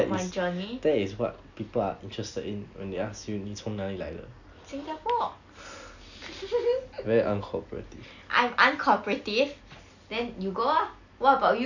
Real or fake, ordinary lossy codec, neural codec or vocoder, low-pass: real; none; none; 7.2 kHz